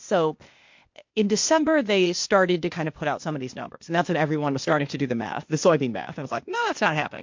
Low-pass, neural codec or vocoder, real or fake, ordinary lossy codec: 7.2 kHz; codec, 16 kHz, 0.8 kbps, ZipCodec; fake; MP3, 48 kbps